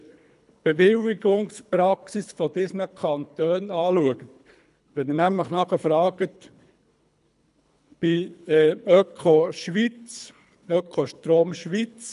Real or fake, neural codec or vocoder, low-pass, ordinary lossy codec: fake; codec, 24 kHz, 3 kbps, HILCodec; 10.8 kHz; none